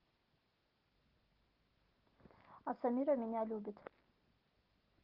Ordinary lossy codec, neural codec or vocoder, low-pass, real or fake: Opus, 16 kbps; none; 5.4 kHz; real